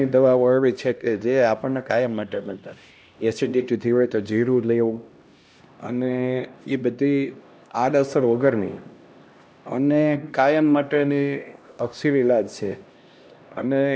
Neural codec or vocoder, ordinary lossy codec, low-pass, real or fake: codec, 16 kHz, 1 kbps, X-Codec, HuBERT features, trained on LibriSpeech; none; none; fake